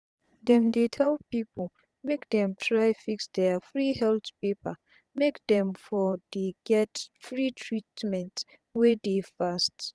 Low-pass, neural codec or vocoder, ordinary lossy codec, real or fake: none; vocoder, 22.05 kHz, 80 mel bands, Vocos; none; fake